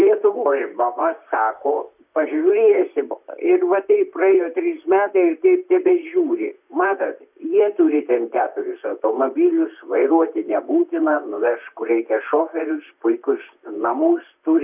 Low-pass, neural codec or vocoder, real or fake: 3.6 kHz; vocoder, 44.1 kHz, 128 mel bands, Pupu-Vocoder; fake